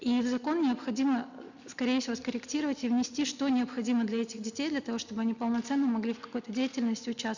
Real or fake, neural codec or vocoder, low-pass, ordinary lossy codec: real; none; 7.2 kHz; none